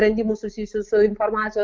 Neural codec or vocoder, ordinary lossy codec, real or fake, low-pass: none; Opus, 24 kbps; real; 7.2 kHz